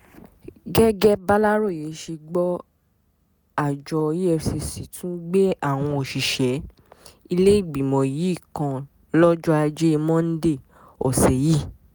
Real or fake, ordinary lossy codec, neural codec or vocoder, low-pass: real; none; none; none